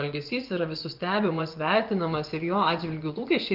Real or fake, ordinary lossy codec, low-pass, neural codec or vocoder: fake; Opus, 32 kbps; 5.4 kHz; vocoder, 24 kHz, 100 mel bands, Vocos